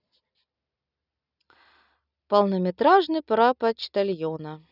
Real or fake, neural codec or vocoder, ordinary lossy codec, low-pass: real; none; none; 5.4 kHz